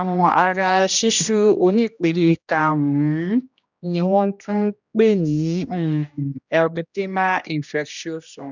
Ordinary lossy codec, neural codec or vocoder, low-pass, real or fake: none; codec, 16 kHz, 1 kbps, X-Codec, HuBERT features, trained on general audio; 7.2 kHz; fake